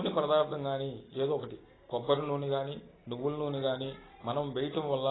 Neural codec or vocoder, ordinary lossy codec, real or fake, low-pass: none; AAC, 16 kbps; real; 7.2 kHz